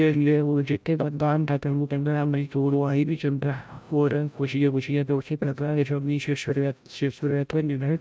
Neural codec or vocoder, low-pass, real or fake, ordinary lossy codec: codec, 16 kHz, 0.5 kbps, FreqCodec, larger model; none; fake; none